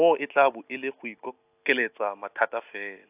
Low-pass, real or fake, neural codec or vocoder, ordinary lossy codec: 3.6 kHz; real; none; AAC, 32 kbps